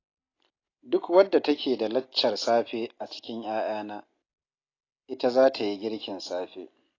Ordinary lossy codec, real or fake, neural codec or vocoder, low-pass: AAC, 32 kbps; real; none; 7.2 kHz